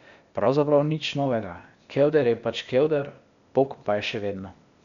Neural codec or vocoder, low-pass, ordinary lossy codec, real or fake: codec, 16 kHz, 0.8 kbps, ZipCodec; 7.2 kHz; none; fake